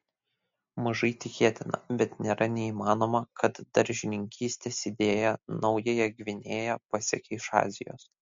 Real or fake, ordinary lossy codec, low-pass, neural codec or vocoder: real; MP3, 48 kbps; 7.2 kHz; none